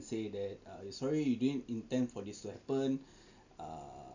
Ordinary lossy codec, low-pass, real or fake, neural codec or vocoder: none; 7.2 kHz; real; none